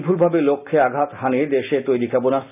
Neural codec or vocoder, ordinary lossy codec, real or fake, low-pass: none; none; real; 3.6 kHz